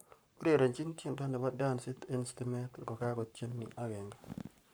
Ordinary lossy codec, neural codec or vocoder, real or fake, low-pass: none; codec, 44.1 kHz, 7.8 kbps, Pupu-Codec; fake; none